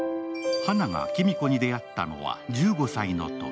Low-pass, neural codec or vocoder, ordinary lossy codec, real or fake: none; none; none; real